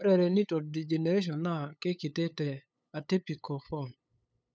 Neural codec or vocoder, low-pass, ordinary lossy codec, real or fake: codec, 16 kHz, 8 kbps, FunCodec, trained on LibriTTS, 25 frames a second; none; none; fake